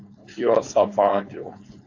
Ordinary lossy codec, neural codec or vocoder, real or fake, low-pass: AAC, 48 kbps; codec, 16 kHz, 4.8 kbps, FACodec; fake; 7.2 kHz